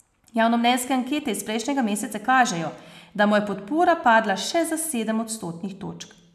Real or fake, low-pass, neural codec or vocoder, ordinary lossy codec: real; 14.4 kHz; none; none